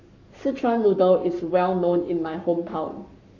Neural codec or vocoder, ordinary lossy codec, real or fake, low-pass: codec, 44.1 kHz, 7.8 kbps, Pupu-Codec; none; fake; 7.2 kHz